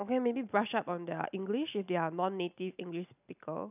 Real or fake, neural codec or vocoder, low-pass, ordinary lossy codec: real; none; 3.6 kHz; none